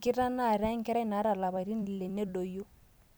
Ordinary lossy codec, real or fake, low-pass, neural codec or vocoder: none; fake; none; vocoder, 44.1 kHz, 128 mel bands every 256 samples, BigVGAN v2